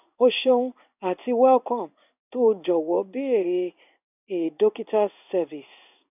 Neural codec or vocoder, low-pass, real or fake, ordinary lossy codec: codec, 16 kHz in and 24 kHz out, 1 kbps, XY-Tokenizer; 3.6 kHz; fake; none